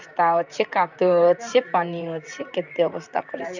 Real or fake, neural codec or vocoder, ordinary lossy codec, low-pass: fake; vocoder, 44.1 kHz, 128 mel bands, Pupu-Vocoder; none; 7.2 kHz